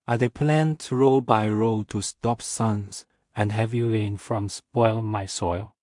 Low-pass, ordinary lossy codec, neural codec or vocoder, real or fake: 10.8 kHz; MP3, 64 kbps; codec, 16 kHz in and 24 kHz out, 0.4 kbps, LongCat-Audio-Codec, two codebook decoder; fake